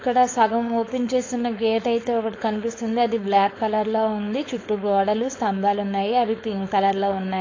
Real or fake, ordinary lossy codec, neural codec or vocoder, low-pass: fake; AAC, 32 kbps; codec, 16 kHz, 4.8 kbps, FACodec; 7.2 kHz